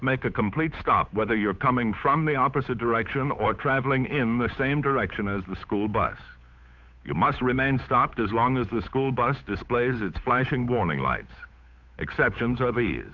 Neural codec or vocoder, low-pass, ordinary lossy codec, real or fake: codec, 16 kHz, 8 kbps, FunCodec, trained on Chinese and English, 25 frames a second; 7.2 kHz; Opus, 64 kbps; fake